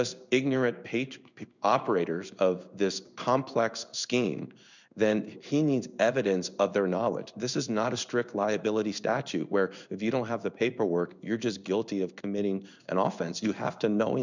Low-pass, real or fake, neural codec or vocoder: 7.2 kHz; fake; codec, 16 kHz in and 24 kHz out, 1 kbps, XY-Tokenizer